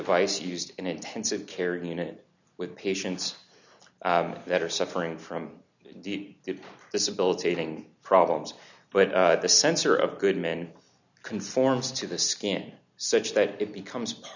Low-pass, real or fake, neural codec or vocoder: 7.2 kHz; real; none